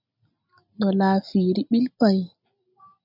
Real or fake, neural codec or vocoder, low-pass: real; none; 5.4 kHz